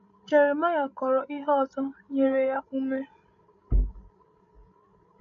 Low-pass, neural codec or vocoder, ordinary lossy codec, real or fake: 7.2 kHz; codec, 16 kHz, 16 kbps, FreqCodec, larger model; MP3, 64 kbps; fake